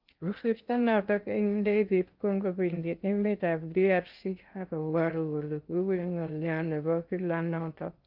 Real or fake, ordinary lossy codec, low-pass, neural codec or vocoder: fake; Opus, 32 kbps; 5.4 kHz; codec, 16 kHz in and 24 kHz out, 0.6 kbps, FocalCodec, streaming, 2048 codes